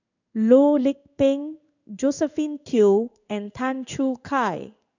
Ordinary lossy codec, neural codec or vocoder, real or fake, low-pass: none; codec, 16 kHz in and 24 kHz out, 1 kbps, XY-Tokenizer; fake; 7.2 kHz